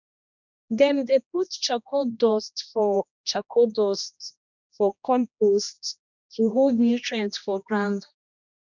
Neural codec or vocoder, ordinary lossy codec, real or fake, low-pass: codec, 16 kHz, 1 kbps, X-Codec, HuBERT features, trained on general audio; none; fake; 7.2 kHz